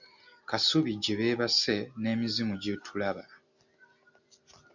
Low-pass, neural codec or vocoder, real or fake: 7.2 kHz; none; real